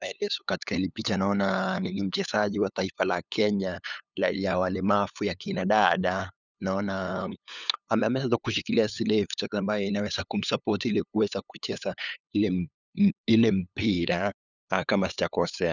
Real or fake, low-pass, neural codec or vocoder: fake; 7.2 kHz; codec, 16 kHz, 8 kbps, FunCodec, trained on LibriTTS, 25 frames a second